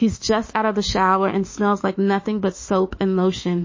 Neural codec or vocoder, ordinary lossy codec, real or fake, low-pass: autoencoder, 48 kHz, 32 numbers a frame, DAC-VAE, trained on Japanese speech; MP3, 32 kbps; fake; 7.2 kHz